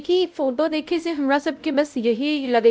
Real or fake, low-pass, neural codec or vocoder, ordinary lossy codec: fake; none; codec, 16 kHz, 0.5 kbps, X-Codec, WavLM features, trained on Multilingual LibriSpeech; none